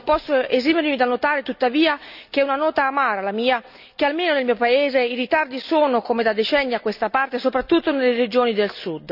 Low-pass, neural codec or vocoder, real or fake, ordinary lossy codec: 5.4 kHz; none; real; none